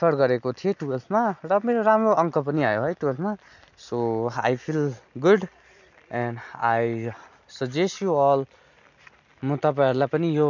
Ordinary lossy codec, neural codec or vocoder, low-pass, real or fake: none; none; 7.2 kHz; real